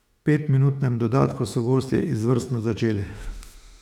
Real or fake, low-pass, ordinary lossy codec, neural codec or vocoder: fake; 19.8 kHz; none; autoencoder, 48 kHz, 32 numbers a frame, DAC-VAE, trained on Japanese speech